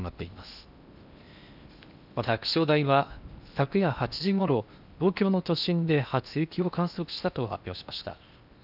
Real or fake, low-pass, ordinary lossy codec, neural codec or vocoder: fake; 5.4 kHz; none; codec, 16 kHz in and 24 kHz out, 0.8 kbps, FocalCodec, streaming, 65536 codes